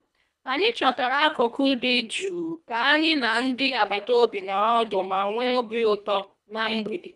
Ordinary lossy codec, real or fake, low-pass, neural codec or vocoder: none; fake; none; codec, 24 kHz, 1.5 kbps, HILCodec